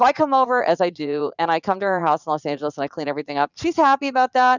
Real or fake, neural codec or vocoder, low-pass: fake; vocoder, 44.1 kHz, 80 mel bands, Vocos; 7.2 kHz